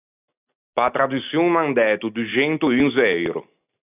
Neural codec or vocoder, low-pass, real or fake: none; 3.6 kHz; real